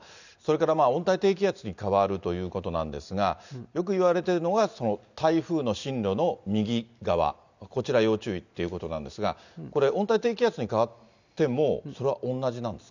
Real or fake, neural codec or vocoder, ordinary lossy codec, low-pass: real; none; none; 7.2 kHz